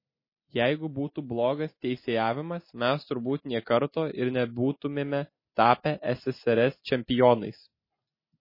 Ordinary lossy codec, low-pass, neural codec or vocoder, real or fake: MP3, 24 kbps; 5.4 kHz; none; real